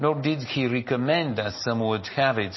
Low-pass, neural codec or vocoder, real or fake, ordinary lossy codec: 7.2 kHz; none; real; MP3, 24 kbps